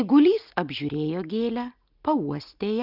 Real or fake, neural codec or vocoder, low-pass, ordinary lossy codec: real; none; 5.4 kHz; Opus, 24 kbps